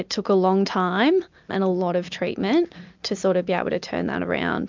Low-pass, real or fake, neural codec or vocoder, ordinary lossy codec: 7.2 kHz; real; none; MP3, 64 kbps